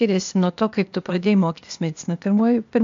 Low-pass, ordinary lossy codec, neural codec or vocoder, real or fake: 7.2 kHz; AAC, 64 kbps; codec, 16 kHz, 0.8 kbps, ZipCodec; fake